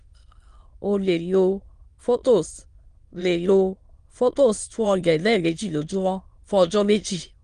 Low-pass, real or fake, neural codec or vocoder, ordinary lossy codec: 9.9 kHz; fake; autoencoder, 22.05 kHz, a latent of 192 numbers a frame, VITS, trained on many speakers; Opus, 24 kbps